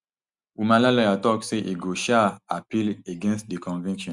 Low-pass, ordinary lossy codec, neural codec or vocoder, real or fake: 10.8 kHz; Opus, 64 kbps; none; real